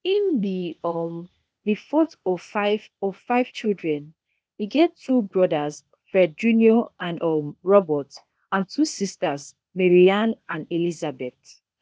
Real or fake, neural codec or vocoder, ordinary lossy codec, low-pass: fake; codec, 16 kHz, 0.8 kbps, ZipCodec; none; none